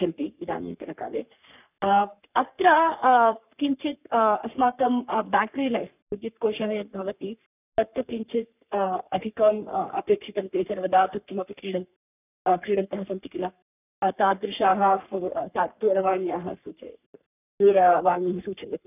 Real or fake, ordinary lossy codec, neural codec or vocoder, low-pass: fake; none; codec, 44.1 kHz, 3.4 kbps, Pupu-Codec; 3.6 kHz